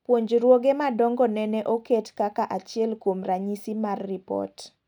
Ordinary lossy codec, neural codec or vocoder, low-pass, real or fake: none; none; 19.8 kHz; real